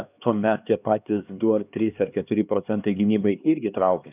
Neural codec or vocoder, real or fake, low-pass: codec, 16 kHz, 2 kbps, X-Codec, HuBERT features, trained on LibriSpeech; fake; 3.6 kHz